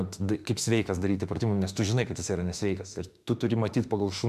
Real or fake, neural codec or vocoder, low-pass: fake; autoencoder, 48 kHz, 32 numbers a frame, DAC-VAE, trained on Japanese speech; 14.4 kHz